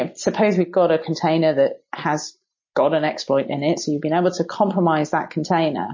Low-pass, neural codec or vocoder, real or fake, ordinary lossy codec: 7.2 kHz; none; real; MP3, 32 kbps